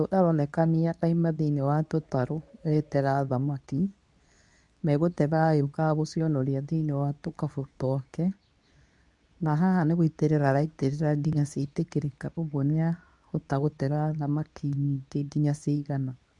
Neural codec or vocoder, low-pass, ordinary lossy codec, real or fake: codec, 24 kHz, 0.9 kbps, WavTokenizer, medium speech release version 2; 10.8 kHz; none; fake